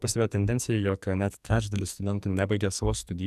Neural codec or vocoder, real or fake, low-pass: codec, 44.1 kHz, 2.6 kbps, SNAC; fake; 14.4 kHz